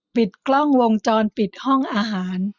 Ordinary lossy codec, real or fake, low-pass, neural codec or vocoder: none; real; 7.2 kHz; none